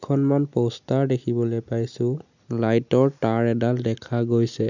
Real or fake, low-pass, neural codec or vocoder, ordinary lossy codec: real; 7.2 kHz; none; none